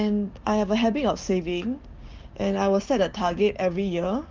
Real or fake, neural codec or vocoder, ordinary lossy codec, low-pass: fake; codec, 16 kHz, 6 kbps, DAC; Opus, 24 kbps; 7.2 kHz